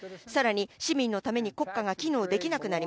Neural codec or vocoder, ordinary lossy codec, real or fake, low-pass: none; none; real; none